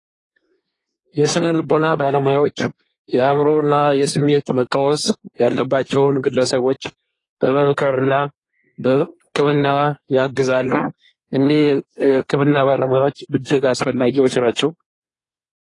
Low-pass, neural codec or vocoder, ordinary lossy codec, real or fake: 10.8 kHz; codec, 24 kHz, 1 kbps, SNAC; AAC, 32 kbps; fake